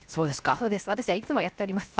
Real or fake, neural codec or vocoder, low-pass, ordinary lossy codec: fake; codec, 16 kHz, 0.7 kbps, FocalCodec; none; none